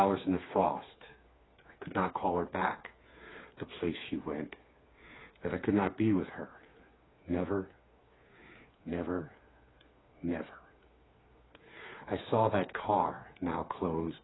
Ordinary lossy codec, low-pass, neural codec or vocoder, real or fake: AAC, 16 kbps; 7.2 kHz; codec, 16 kHz, 4 kbps, FreqCodec, smaller model; fake